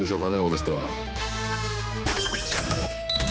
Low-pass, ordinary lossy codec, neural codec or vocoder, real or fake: none; none; codec, 16 kHz, 2 kbps, X-Codec, HuBERT features, trained on general audio; fake